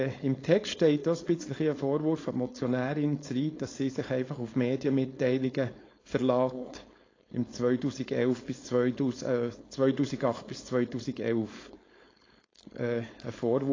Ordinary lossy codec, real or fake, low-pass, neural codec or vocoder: AAC, 32 kbps; fake; 7.2 kHz; codec, 16 kHz, 4.8 kbps, FACodec